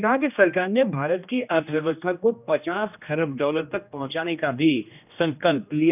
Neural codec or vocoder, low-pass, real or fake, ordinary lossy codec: codec, 16 kHz, 1 kbps, X-Codec, HuBERT features, trained on general audio; 3.6 kHz; fake; none